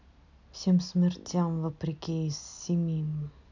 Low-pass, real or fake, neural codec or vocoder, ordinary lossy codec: 7.2 kHz; real; none; none